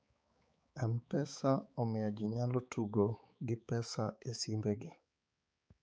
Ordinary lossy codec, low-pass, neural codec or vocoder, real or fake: none; none; codec, 16 kHz, 4 kbps, X-Codec, HuBERT features, trained on balanced general audio; fake